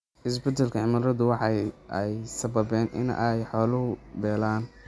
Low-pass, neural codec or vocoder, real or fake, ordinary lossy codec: none; none; real; none